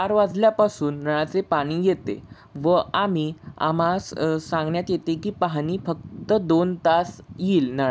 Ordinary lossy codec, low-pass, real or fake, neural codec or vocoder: none; none; real; none